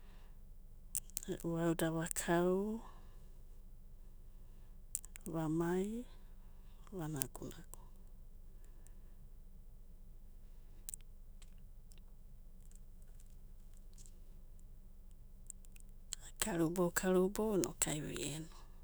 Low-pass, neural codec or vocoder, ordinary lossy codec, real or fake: none; autoencoder, 48 kHz, 128 numbers a frame, DAC-VAE, trained on Japanese speech; none; fake